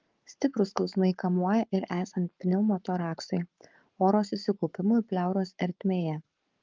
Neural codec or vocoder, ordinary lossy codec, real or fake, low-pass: codec, 44.1 kHz, 7.8 kbps, Pupu-Codec; Opus, 32 kbps; fake; 7.2 kHz